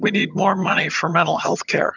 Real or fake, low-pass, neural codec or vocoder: fake; 7.2 kHz; vocoder, 22.05 kHz, 80 mel bands, HiFi-GAN